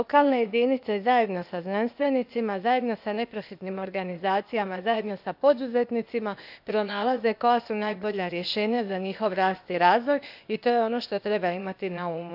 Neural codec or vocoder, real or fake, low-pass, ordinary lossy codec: codec, 16 kHz, 0.8 kbps, ZipCodec; fake; 5.4 kHz; none